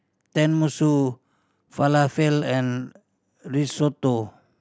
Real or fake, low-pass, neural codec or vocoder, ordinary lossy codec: real; none; none; none